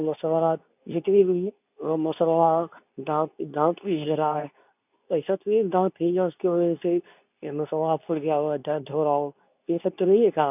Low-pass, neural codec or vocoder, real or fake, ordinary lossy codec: 3.6 kHz; codec, 24 kHz, 0.9 kbps, WavTokenizer, medium speech release version 2; fake; none